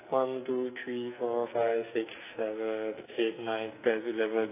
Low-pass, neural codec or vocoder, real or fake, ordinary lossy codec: 3.6 kHz; codec, 44.1 kHz, 2.6 kbps, SNAC; fake; AAC, 24 kbps